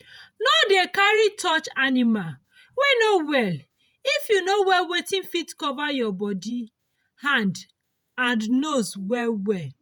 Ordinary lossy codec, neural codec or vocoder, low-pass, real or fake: none; vocoder, 48 kHz, 128 mel bands, Vocos; none; fake